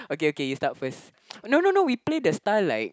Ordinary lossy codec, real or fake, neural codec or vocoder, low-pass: none; real; none; none